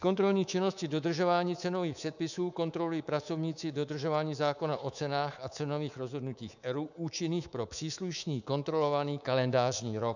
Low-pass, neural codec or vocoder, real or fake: 7.2 kHz; codec, 24 kHz, 3.1 kbps, DualCodec; fake